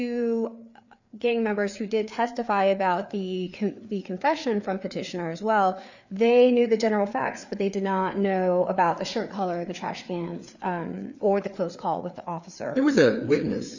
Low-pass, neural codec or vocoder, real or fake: 7.2 kHz; codec, 16 kHz, 4 kbps, FreqCodec, larger model; fake